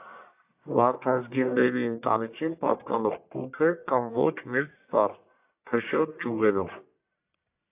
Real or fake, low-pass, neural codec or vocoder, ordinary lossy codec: fake; 3.6 kHz; codec, 44.1 kHz, 1.7 kbps, Pupu-Codec; AAC, 32 kbps